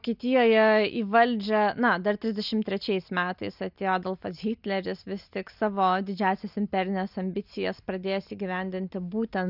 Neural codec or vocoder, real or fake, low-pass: none; real; 5.4 kHz